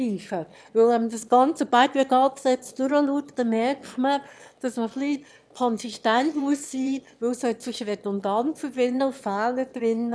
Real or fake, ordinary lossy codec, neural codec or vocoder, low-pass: fake; none; autoencoder, 22.05 kHz, a latent of 192 numbers a frame, VITS, trained on one speaker; none